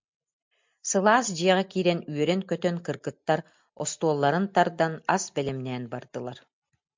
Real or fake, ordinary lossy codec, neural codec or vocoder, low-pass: real; MP3, 64 kbps; none; 7.2 kHz